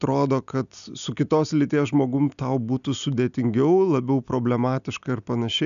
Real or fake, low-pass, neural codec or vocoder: real; 7.2 kHz; none